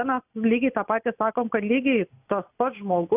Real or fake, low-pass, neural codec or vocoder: real; 3.6 kHz; none